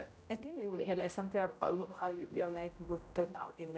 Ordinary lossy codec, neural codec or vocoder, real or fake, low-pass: none; codec, 16 kHz, 0.5 kbps, X-Codec, HuBERT features, trained on general audio; fake; none